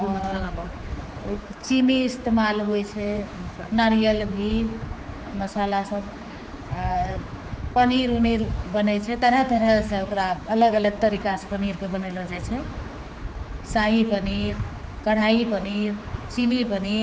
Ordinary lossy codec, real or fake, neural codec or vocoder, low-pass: none; fake; codec, 16 kHz, 4 kbps, X-Codec, HuBERT features, trained on general audio; none